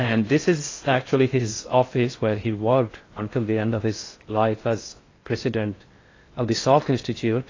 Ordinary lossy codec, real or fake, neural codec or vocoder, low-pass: AAC, 32 kbps; fake; codec, 16 kHz in and 24 kHz out, 0.6 kbps, FocalCodec, streaming, 4096 codes; 7.2 kHz